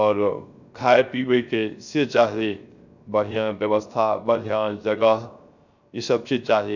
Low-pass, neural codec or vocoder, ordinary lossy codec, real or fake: 7.2 kHz; codec, 16 kHz, 0.3 kbps, FocalCodec; none; fake